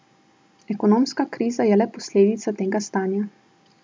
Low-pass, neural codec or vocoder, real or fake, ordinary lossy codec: none; none; real; none